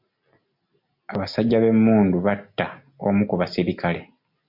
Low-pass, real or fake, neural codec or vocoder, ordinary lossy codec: 5.4 kHz; real; none; MP3, 48 kbps